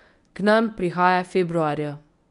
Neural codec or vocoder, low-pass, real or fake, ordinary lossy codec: codec, 24 kHz, 0.9 kbps, WavTokenizer, medium speech release version 1; 10.8 kHz; fake; none